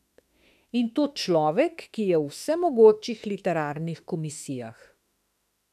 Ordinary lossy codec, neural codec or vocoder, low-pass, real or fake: none; autoencoder, 48 kHz, 32 numbers a frame, DAC-VAE, trained on Japanese speech; 14.4 kHz; fake